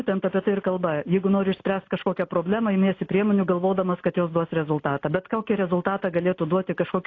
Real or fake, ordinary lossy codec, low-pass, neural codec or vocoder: real; AAC, 32 kbps; 7.2 kHz; none